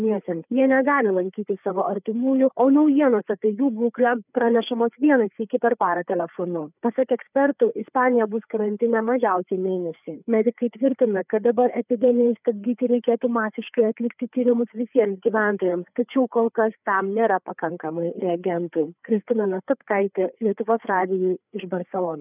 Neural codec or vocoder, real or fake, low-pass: codec, 16 kHz, 4 kbps, FreqCodec, larger model; fake; 3.6 kHz